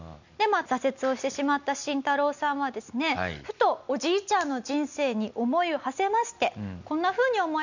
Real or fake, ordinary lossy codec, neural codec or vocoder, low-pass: real; none; none; 7.2 kHz